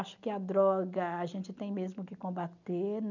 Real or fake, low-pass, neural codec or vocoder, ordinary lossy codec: real; 7.2 kHz; none; none